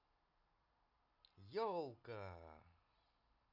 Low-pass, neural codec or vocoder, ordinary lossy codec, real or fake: 5.4 kHz; none; none; real